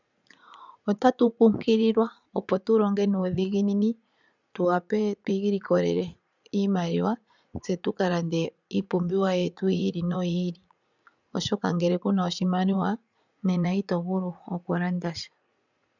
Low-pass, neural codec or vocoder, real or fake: 7.2 kHz; vocoder, 44.1 kHz, 80 mel bands, Vocos; fake